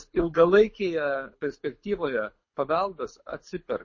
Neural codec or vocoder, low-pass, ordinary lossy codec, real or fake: codec, 24 kHz, 6 kbps, HILCodec; 7.2 kHz; MP3, 32 kbps; fake